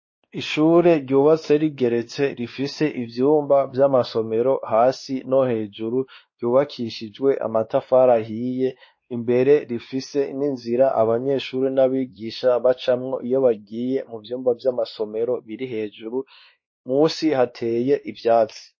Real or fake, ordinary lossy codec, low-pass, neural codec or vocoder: fake; MP3, 32 kbps; 7.2 kHz; codec, 16 kHz, 2 kbps, X-Codec, WavLM features, trained on Multilingual LibriSpeech